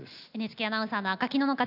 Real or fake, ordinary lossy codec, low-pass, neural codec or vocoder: real; none; 5.4 kHz; none